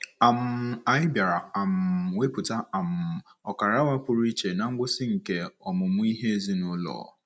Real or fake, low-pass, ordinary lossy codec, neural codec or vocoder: real; none; none; none